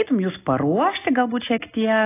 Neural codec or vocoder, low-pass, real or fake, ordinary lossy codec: none; 3.6 kHz; real; AAC, 16 kbps